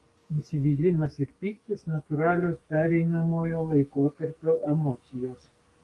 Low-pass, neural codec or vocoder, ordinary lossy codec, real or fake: 10.8 kHz; codec, 44.1 kHz, 2.6 kbps, SNAC; Opus, 32 kbps; fake